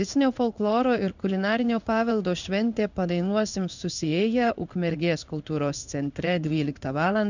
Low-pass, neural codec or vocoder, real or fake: 7.2 kHz; codec, 16 kHz in and 24 kHz out, 1 kbps, XY-Tokenizer; fake